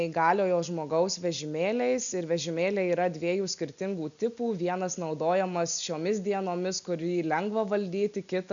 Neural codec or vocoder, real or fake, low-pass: none; real; 7.2 kHz